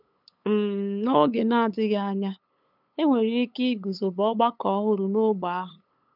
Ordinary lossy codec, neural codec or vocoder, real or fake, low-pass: none; codec, 16 kHz, 8 kbps, FunCodec, trained on LibriTTS, 25 frames a second; fake; 5.4 kHz